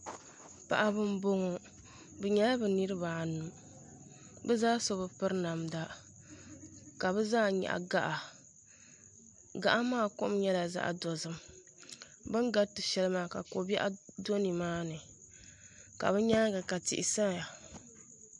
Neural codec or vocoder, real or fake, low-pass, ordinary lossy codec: none; real; 10.8 kHz; MP3, 64 kbps